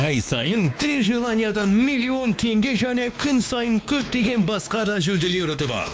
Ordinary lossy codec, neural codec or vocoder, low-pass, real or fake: none; codec, 16 kHz, 2 kbps, X-Codec, WavLM features, trained on Multilingual LibriSpeech; none; fake